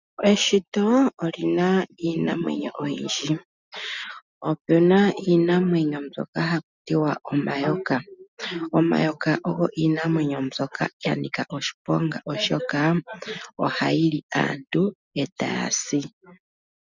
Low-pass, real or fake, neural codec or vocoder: 7.2 kHz; real; none